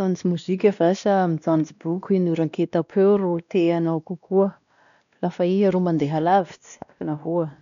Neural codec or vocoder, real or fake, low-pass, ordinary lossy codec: codec, 16 kHz, 1 kbps, X-Codec, WavLM features, trained on Multilingual LibriSpeech; fake; 7.2 kHz; none